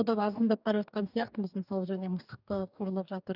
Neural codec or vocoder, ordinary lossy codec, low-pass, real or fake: codec, 44.1 kHz, 2.6 kbps, DAC; none; 5.4 kHz; fake